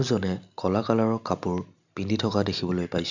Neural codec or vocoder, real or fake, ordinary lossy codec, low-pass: none; real; none; 7.2 kHz